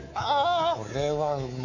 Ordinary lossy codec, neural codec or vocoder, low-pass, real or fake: none; codec, 24 kHz, 3.1 kbps, DualCodec; 7.2 kHz; fake